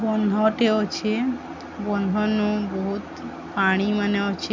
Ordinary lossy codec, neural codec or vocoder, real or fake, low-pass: MP3, 64 kbps; none; real; 7.2 kHz